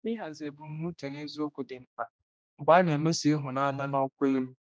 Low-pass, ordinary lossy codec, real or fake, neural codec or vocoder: none; none; fake; codec, 16 kHz, 1 kbps, X-Codec, HuBERT features, trained on general audio